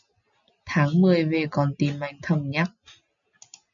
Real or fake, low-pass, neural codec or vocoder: real; 7.2 kHz; none